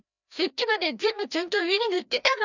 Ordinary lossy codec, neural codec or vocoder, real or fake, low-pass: none; codec, 16 kHz, 1 kbps, FreqCodec, larger model; fake; 7.2 kHz